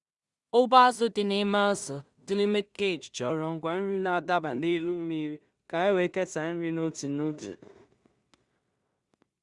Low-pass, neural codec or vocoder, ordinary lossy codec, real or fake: 10.8 kHz; codec, 16 kHz in and 24 kHz out, 0.4 kbps, LongCat-Audio-Codec, two codebook decoder; Opus, 64 kbps; fake